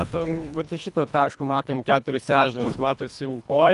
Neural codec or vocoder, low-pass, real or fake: codec, 24 kHz, 1.5 kbps, HILCodec; 10.8 kHz; fake